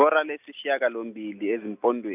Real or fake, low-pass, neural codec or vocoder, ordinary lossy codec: real; 3.6 kHz; none; none